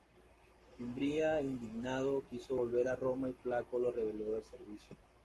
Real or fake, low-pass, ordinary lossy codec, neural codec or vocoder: fake; 14.4 kHz; Opus, 32 kbps; codec, 44.1 kHz, 7.8 kbps, Pupu-Codec